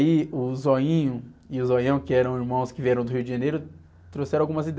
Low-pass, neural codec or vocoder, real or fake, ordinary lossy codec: none; none; real; none